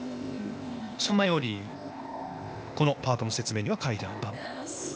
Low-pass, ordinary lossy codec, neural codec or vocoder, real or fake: none; none; codec, 16 kHz, 0.8 kbps, ZipCodec; fake